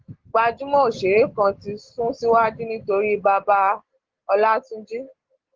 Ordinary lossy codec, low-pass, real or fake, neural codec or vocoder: Opus, 16 kbps; 7.2 kHz; real; none